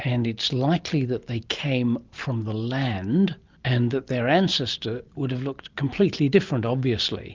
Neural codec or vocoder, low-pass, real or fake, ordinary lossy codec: none; 7.2 kHz; real; Opus, 32 kbps